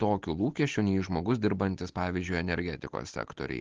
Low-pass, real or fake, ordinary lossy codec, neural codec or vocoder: 7.2 kHz; real; Opus, 16 kbps; none